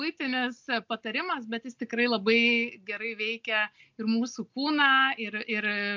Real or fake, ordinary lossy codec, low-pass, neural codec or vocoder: real; MP3, 64 kbps; 7.2 kHz; none